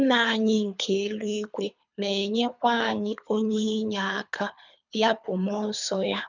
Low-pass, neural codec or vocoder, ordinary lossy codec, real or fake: 7.2 kHz; codec, 24 kHz, 3 kbps, HILCodec; none; fake